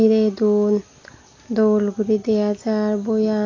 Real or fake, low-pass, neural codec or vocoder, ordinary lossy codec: real; 7.2 kHz; none; AAC, 32 kbps